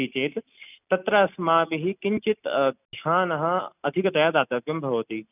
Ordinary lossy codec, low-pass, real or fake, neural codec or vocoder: none; 3.6 kHz; real; none